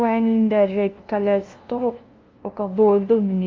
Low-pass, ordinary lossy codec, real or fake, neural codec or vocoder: 7.2 kHz; Opus, 32 kbps; fake; codec, 16 kHz, 0.5 kbps, FunCodec, trained on LibriTTS, 25 frames a second